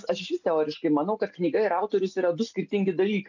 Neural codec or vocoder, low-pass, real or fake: none; 7.2 kHz; real